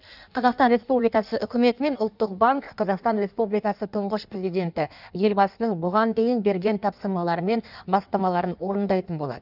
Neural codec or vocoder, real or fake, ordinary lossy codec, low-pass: codec, 16 kHz in and 24 kHz out, 1.1 kbps, FireRedTTS-2 codec; fake; none; 5.4 kHz